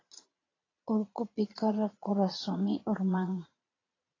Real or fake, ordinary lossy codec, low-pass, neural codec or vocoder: fake; AAC, 32 kbps; 7.2 kHz; vocoder, 22.05 kHz, 80 mel bands, Vocos